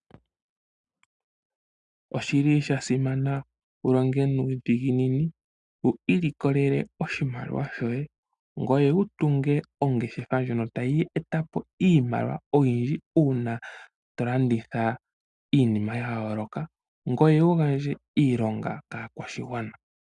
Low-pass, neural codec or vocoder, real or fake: 10.8 kHz; none; real